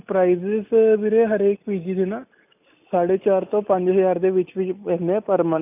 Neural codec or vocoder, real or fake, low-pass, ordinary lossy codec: none; real; 3.6 kHz; none